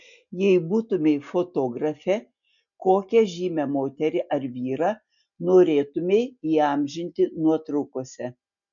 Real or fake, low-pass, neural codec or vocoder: real; 7.2 kHz; none